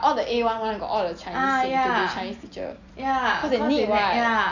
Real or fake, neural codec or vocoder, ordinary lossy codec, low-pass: real; none; none; 7.2 kHz